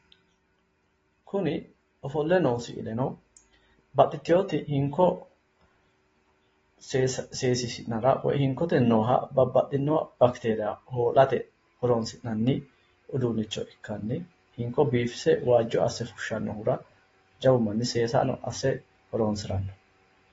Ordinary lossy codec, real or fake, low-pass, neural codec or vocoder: AAC, 24 kbps; real; 19.8 kHz; none